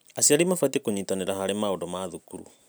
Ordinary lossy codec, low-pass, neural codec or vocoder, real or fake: none; none; none; real